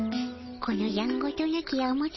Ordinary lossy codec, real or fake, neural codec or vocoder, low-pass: MP3, 24 kbps; real; none; 7.2 kHz